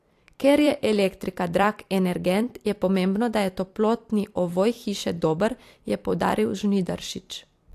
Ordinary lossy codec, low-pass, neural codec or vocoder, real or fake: AAC, 64 kbps; 14.4 kHz; vocoder, 44.1 kHz, 128 mel bands every 256 samples, BigVGAN v2; fake